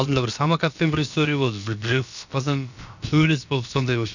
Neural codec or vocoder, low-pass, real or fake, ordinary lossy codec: codec, 16 kHz, about 1 kbps, DyCAST, with the encoder's durations; 7.2 kHz; fake; none